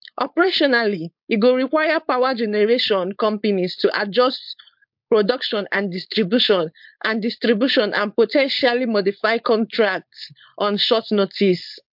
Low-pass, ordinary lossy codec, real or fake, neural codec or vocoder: 5.4 kHz; MP3, 48 kbps; fake; codec, 16 kHz, 4.8 kbps, FACodec